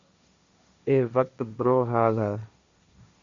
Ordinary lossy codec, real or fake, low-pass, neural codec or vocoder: Opus, 64 kbps; fake; 7.2 kHz; codec, 16 kHz, 1.1 kbps, Voila-Tokenizer